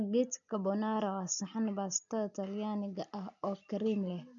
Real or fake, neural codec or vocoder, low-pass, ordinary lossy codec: real; none; 7.2 kHz; none